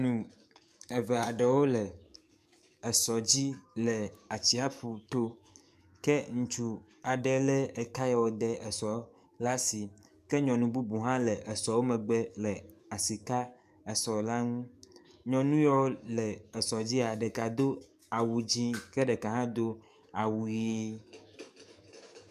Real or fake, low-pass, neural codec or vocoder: fake; 14.4 kHz; codec, 44.1 kHz, 7.8 kbps, DAC